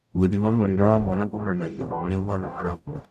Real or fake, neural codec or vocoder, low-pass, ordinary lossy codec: fake; codec, 44.1 kHz, 0.9 kbps, DAC; 14.4 kHz; none